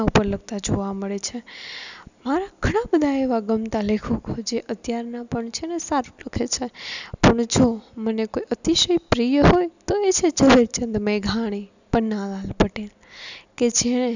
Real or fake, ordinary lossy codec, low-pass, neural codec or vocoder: real; none; 7.2 kHz; none